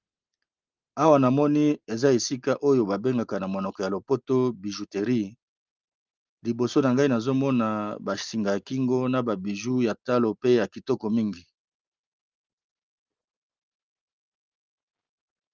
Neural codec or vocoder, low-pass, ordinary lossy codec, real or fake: none; 7.2 kHz; Opus, 24 kbps; real